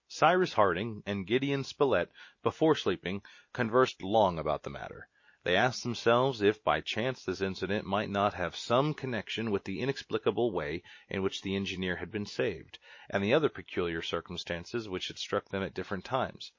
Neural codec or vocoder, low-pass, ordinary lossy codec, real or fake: none; 7.2 kHz; MP3, 32 kbps; real